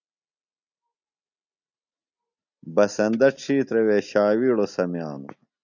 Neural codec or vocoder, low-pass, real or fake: none; 7.2 kHz; real